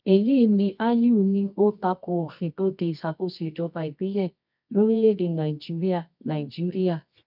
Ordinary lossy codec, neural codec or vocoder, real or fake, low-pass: none; codec, 24 kHz, 0.9 kbps, WavTokenizer, medium music audio release; fake; 5.4 kHz